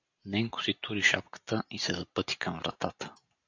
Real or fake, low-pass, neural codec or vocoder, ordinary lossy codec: real; 7.2 kHz; none; AAC, 48 kbps